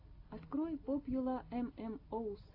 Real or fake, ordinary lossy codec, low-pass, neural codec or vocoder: real; AAC, 32 kbps; 5.4 kHz; none